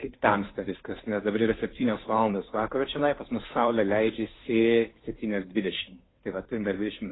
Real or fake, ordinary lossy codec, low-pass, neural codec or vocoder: fake; AAC, 16 kbps; 7.2 kHz; codec, 16 kHz, 2 kbps, FunCodec, trained on Chinese and English, 25 frames a second